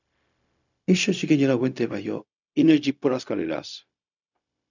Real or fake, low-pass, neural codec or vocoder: fake; 7.2 kHz; codec, 16 kHz, 0.4 kbps, LongCat-Audio-Codec